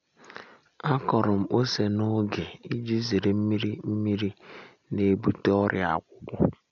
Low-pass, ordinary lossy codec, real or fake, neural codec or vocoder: 7.2 kHz; none; real; none